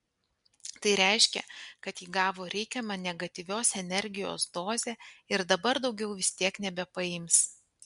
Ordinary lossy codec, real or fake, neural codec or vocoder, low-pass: MP3, 64 kbps; real; none; 10.8 kHz